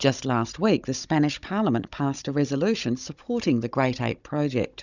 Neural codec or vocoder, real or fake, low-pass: codec, 16 kHz, 16 kbps, FunCodec, trained on Chinese and English, 50 frames a second; fake; 7.2 kHz